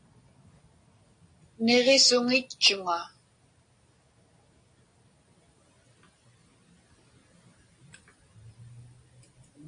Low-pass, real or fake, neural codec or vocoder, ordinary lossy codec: 9.9 kHz; real; none; AAC, 48 kbps